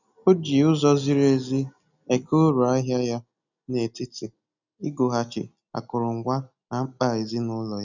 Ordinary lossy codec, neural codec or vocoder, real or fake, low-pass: none; none; real; 7.2 kHz